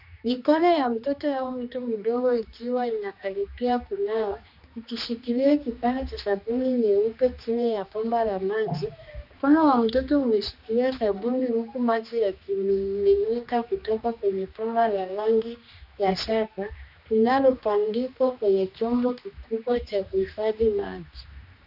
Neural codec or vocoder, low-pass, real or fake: codec, 16 kHz, 2 kbps, X-Codec, HuBERT features, trained on general audio; 5.4 kHz; fake